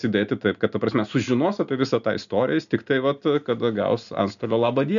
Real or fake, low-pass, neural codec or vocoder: real; 7.2 kHz; none